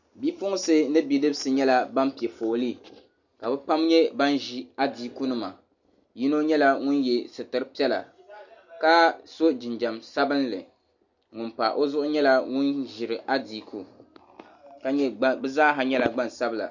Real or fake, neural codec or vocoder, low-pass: real; none; 7.2 kHz